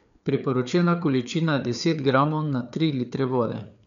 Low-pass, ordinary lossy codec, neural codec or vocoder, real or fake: 7.2 kHz; none; codec, 16 kHz, 4 kbps, FreqCodec, larger model; fake